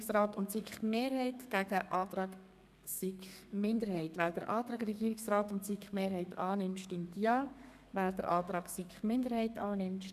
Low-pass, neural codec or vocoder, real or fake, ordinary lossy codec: 14.4 kHz; codec, 32 kHz, 1.9 kbps, SNAC; fake; none